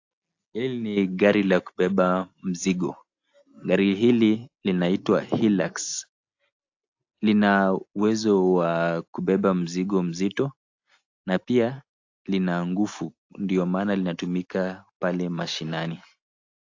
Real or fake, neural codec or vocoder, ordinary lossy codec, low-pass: real; none; AAC, 48 kbps; 7.2 kHz